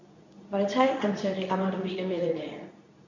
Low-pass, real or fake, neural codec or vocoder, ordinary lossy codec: 7.2 kHz; fake; codec, 24 kHz, 0.9 kbps, WavTokenizer, medium speech release version 2; none